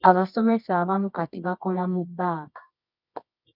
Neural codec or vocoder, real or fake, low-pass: codec, 24 kHz, 0.9 kbps, WavTokenizer, medium music audio release; fake; 5.4 kHz